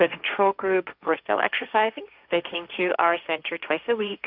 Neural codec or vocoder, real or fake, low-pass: codec, 16 kHz, 1.1 kbps, Voila-Tokenizer; fake; 5.4 kHz